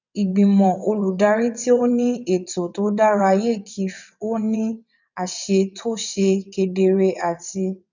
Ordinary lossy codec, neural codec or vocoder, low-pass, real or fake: none; vocoder, 22.05 kHz, 80 mel bands, WaveNeXt; 7.2 kHz; fake